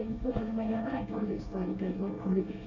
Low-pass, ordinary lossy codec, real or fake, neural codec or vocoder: 7.2 kHz; none; fake; codec, 24 kHz, 1 kbps, SNAC